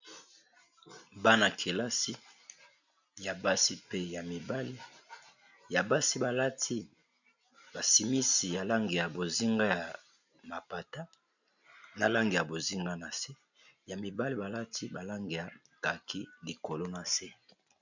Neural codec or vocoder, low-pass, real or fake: none; 7.2 kHz; real